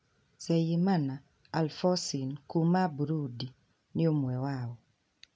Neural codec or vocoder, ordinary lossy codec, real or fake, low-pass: none; none; real; none